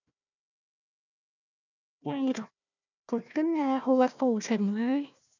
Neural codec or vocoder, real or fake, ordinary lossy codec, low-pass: codec, 16 kHz, 1 kbps, FunCodec, trained on Chinese and English, 50 frames a second; fake; none; 7.2 kHz